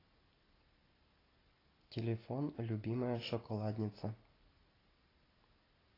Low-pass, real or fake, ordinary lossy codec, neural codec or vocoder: 5.4 kHz; real; AAC, 24 kbps; none